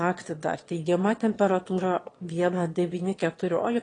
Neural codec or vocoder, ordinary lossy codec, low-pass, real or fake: autoencoder, 22.05 kHz, a latent of 192 numbers a frame, VITS, trained on one speaker; AAC, 32 kbps; 9.9 kHz; fake